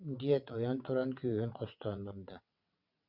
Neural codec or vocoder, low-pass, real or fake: vocoder, 22.05 kHz, 80 mel bands, WaveNeXt; 5.4 kHz; fake